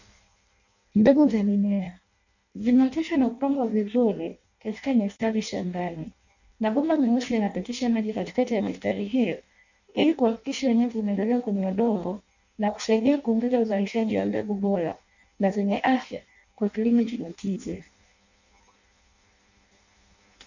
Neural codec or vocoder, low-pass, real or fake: codec, 16 kHz in and 24 kHz out, 0.6 kbps, FireRedTTS-2 codec; 7.2 kHz; fake